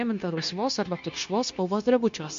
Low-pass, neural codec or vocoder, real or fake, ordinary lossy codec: 7.2 kHz; codec, 16 kHz, 0.9 kbps, LongCat-Audio-Codec; fake; MP3, 48 kbps